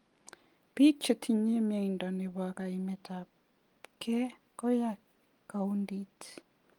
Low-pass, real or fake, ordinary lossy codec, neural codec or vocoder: 19.8 kHz; real; Opus, 32 kbps; none